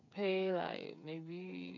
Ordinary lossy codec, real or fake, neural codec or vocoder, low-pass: none; fake; codec, 16 kHz, 8 kbps, FreqCodec, smaller model; 7.2 kHz